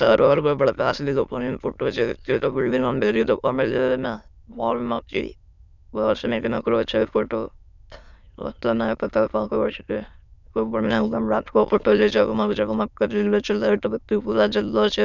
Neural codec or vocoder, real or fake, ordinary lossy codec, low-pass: autoencoder, 22.05 kHz, a latent of 192 numbers a frame, VITS, trained on many speakers; fake; none; 7.2 kHz